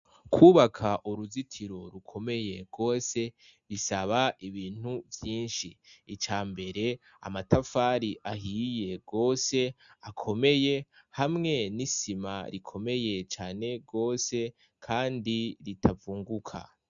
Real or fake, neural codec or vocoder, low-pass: real; none; 7.2 kHz